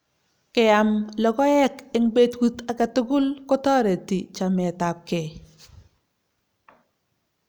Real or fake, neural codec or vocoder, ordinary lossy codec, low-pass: real; none; none; none